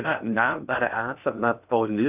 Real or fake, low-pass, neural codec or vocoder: fake; 3.6 kHz; codec, 16 kHz in and 24 kHz out, 0.6 kbps, FocalCodec, streaming, 4096 codes